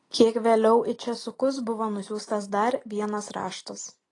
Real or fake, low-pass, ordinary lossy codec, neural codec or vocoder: real; 10.8 kHz; AAC, 32 kbps; none